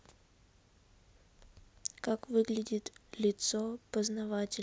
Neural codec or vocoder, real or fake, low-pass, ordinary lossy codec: none; real; none; none